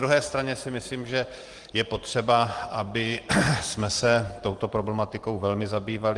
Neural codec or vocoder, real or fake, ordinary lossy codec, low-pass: vocoder, 44.1 kHz, 128 mel bands every 512 samples, BigVGAN v2; fake; Opus, 24 kbps; 10.8 kHz